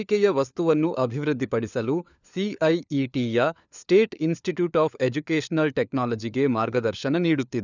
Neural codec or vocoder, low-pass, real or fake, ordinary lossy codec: codec, 16 kHz, 4 kbps, FunCodec, trained on LibriTTS, 50 frames a second; 7.2 kHz; fake; none